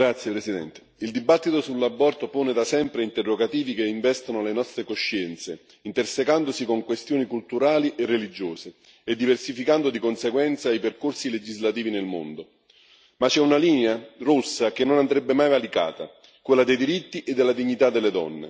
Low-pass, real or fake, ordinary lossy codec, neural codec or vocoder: none; real; none; none